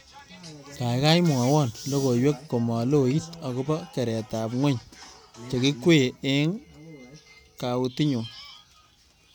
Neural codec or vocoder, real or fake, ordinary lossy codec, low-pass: none; real; none; none